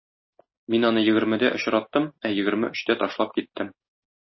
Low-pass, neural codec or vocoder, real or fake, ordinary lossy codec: 7.2 kHz; none; real; MP3, 24 kbps